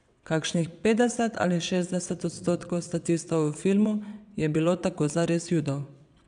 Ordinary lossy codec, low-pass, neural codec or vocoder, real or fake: none; 9.9 kHz; vocoder, 22.05 kHz, 80 mel bands, WaveNeXt; fake